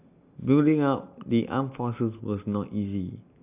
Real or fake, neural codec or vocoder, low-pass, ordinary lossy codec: real; none; 3.6 kHz; AAC, 32 kbps